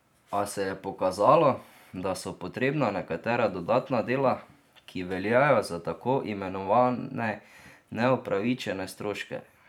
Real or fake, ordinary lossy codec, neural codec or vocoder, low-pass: fake; none; vocoder, 44.1 kHz, 128 mel bands every 512 samples, BigVGAN v2; 19.8 kHz